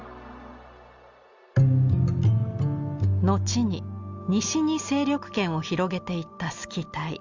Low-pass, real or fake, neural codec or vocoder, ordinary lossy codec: 7.2 kHz; real; none; Opus, 32 kbps